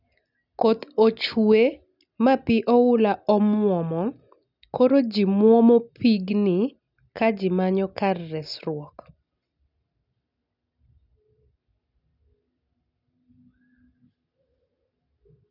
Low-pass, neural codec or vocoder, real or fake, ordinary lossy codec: 5.4 kHz; none; real; none